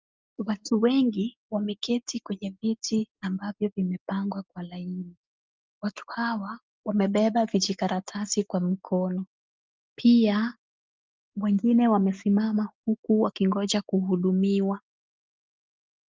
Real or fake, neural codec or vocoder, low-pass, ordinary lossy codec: real; none; 7.2 kHz; Opus, 24 kbps